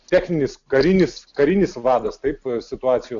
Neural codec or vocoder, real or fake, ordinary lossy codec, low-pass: none; real; AAC, 64 kbps; 7.2 kHz